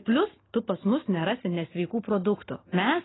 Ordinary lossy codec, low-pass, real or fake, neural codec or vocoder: AAC, 16 kbps; 7.2 kHz; real; none